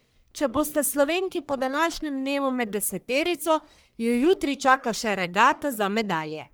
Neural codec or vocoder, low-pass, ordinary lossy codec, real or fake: codec, 44.1 kHz, 1.7 kbps, Pupu-Codec; none; none; fake